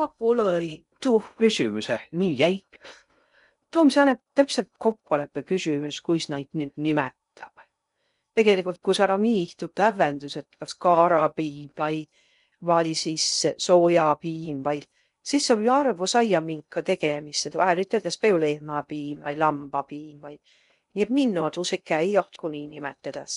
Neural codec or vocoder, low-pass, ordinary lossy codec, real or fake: codec, 16 kHz in and 24 kHz out, 0.6 kbps, FocalCodec, streaming, 2048 codes; 10.8 kHz; none; fake